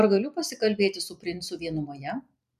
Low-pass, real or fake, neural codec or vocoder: 14.4 kHz; fake; vocoder, 44.1 kHz, 128 mel bands every 256 samples, BigVGAN v2